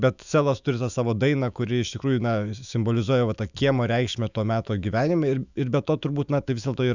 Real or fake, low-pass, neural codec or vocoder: fake; 7.2 kHz; autoencoder, 48 kHz, 128 numbers a frame, DAC-VAE, trained on Japanese speech